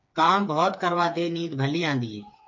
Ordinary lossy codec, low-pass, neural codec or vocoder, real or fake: MP3, 48 kbps; 7.2 kHz; codec, 16 kHz, 4 kbps, FreqCodec, smaller model; fake